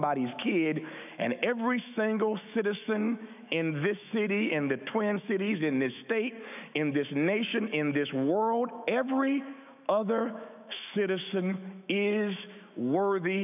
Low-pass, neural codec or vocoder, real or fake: 3.6 kHz; autoencoder, 48 kHz, 128 numbers a frame, DAC-VAE, trained on Japanese speech; fake